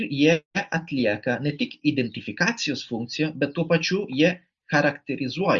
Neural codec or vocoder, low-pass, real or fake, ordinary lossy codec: none; 7.2 kHz; real; Opus, 64 kbps